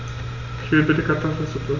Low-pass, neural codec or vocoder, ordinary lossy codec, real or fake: 7.2 kHz; none; none; real